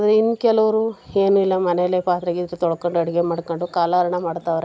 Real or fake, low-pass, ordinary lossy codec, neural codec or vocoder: real; none; none; none